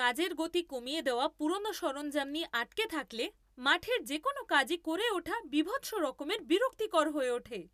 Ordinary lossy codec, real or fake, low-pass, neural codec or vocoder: none; real; 14.4 kHz; none